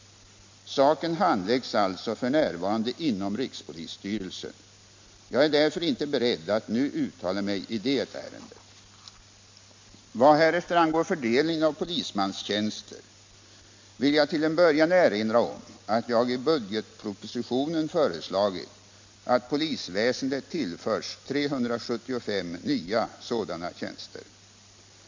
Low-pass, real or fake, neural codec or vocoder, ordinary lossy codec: 7.2 kHz; real; none; MP3, 48 kbps